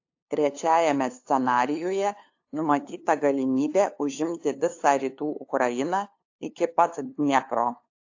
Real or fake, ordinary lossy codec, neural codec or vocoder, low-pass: fake; AAC, 48 kbps; codec, 16 kHz, 2 kbps, FunCodec, trained on LibriTTS, 25 frames a second; 7.2 kHz